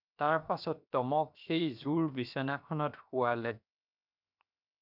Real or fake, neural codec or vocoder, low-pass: fake; codec, 16 kHz, 0.7 kbps, FocalCodec; 5.4 kHz